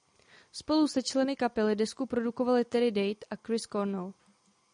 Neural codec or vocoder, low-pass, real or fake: none; 9.9 kHz; real